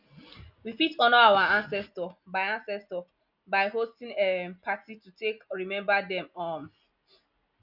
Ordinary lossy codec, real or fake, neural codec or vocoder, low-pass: none; real; none; 5.4 kHz